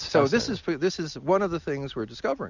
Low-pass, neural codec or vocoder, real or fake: 7.2 kHz; none; real